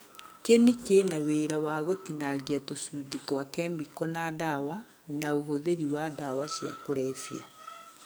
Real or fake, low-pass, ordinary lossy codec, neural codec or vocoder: fake; none; none; codec, 44.1 kHz, 2.6 kbps, SNAC